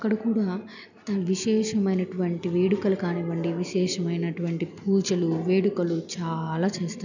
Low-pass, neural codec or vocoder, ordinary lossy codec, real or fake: 7.2 kHz; none; none; real